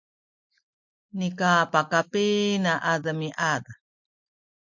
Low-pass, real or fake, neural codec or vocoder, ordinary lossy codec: 7.2 kHz; real; none; MP3, 48 kbps